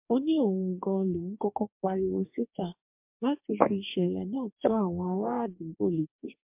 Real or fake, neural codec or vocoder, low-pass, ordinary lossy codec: fake; codec, 44.1 kHz, 2.6 kbps, DAC; 3.6 kHz; MP3, 32 kbps